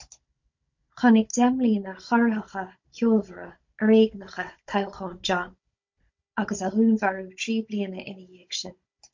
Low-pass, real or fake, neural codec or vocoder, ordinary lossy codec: 7.2 kHz; fake; codec, 16 kHz, 16 kbps, FunCodec, trained on Chinese and English, 50 frames a second; MP3, 48 kbps